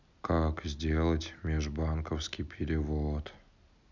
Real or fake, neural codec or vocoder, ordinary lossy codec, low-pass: real; none; none; 7.2 kHz